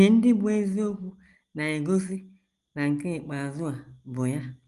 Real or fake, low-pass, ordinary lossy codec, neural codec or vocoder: real; 10.8 kHz; Opus, 24 kbps; none